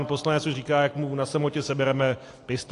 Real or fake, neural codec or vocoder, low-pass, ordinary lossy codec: real; none; 10.8 kHz; AAC, 48 kbps